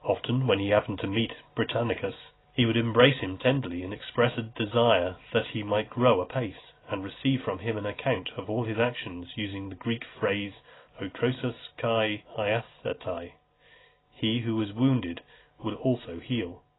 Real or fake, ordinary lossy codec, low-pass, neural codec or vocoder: real; AAC, 16 kbps; 7.2 kHz; none